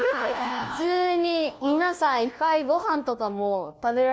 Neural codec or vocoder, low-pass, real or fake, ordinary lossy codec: codec, 16 kHz, 1 kbps, FunCodec, trained on LibriTTS, 50 frames a second; none; fake; none